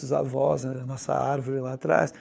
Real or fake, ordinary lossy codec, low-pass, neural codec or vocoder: fake; none; none; codec, 16 kHz, 4 kbps, FunCodec, trained on LibriTTS, 50 frames a second